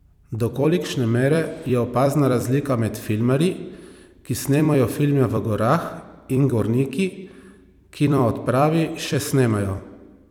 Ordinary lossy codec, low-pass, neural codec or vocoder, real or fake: none; 19.8 kHz; vocoder, 44.1 kHz, 128 mel bands every 256 samples, BigVGAN v2; fake